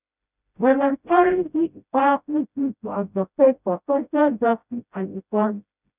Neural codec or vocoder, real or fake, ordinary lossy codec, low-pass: codec, 16 kHz, 0.5 kbps, FreqCodec, smaller model; fake; none; 3.6 kHz